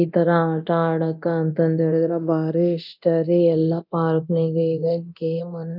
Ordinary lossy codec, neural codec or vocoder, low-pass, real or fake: none; codec, 24 kHz, 0.9 kbps, DualCodec; 5.4 kHz; fake